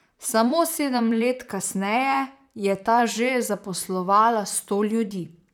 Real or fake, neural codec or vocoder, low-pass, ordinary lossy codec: fake; vocoder, 44.1 kHz, 128 mel bands, Pupu-Vocoder; 19.8 kHz; none